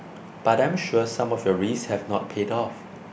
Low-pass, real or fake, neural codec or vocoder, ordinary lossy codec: none; real; none; none